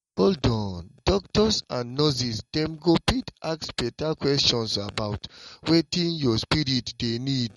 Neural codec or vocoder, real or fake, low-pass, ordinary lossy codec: none; real; 19.8 kHz; MP3, 48 kbps